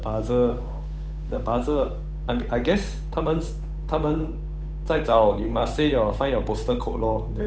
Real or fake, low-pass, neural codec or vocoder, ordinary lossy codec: fake; none; codec, 16 kHz, 8 kbps, FunCodec, trained on Chinese and English, 25 frames a second; none